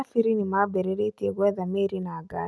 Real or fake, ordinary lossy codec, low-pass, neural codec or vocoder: real; none; none; none